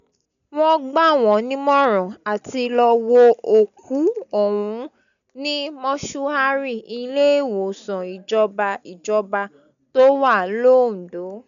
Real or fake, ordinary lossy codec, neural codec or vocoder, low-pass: real; none; none; 7.2 kHz